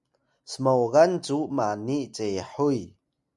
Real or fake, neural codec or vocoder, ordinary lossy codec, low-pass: real; none; MP3, 96 kbps; 10.8 kHz